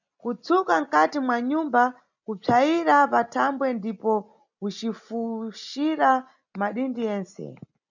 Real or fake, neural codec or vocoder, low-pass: real; none; 7.2 kHz